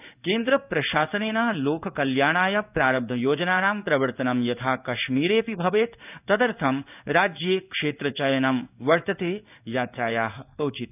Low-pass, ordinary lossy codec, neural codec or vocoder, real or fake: 3.6 kHz; none; codec, 16 kHz in and 24 kHz out, 1 kbps, XY-Tokenizer; fake